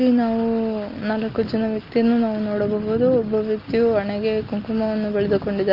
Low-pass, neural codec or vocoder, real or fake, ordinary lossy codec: 5.4 kHz; none; real; Opus, 32 kbps